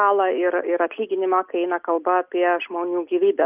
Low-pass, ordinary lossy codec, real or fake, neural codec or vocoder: 3.6 kHz; Opus, 24 kbps; real; none